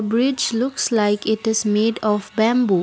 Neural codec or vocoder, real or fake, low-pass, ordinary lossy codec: none; real; none; none